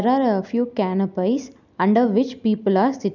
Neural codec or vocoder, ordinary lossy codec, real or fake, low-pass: none; none; real; 7.2 kHz